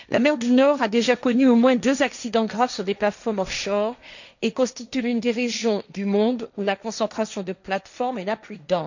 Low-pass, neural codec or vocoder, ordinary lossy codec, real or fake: 7.2 kHz; codec, 16 kHz, 1.1 kbps, Voila-Tokenizer; none; fake